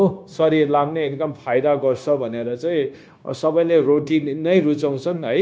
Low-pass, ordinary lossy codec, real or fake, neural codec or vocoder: none; none; fake; codec, 16 kHz, 0.9 kbps, LongCat-Audio-Codec